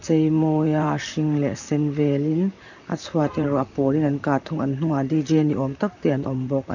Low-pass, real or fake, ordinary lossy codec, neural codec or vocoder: 7.2 kHz; fake; none; vocoder, 44.1 kHz, 128 mel bands, Pupu-Vocoder